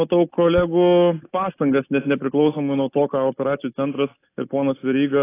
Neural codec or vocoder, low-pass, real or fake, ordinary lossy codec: none; 3.6 kHz; real; AAC, 24 kbps